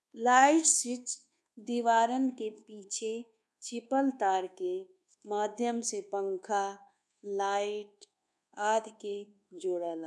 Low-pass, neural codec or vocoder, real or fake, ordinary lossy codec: none; codec, 24 kHz, 1.2 kbps, DualCodec; fake; none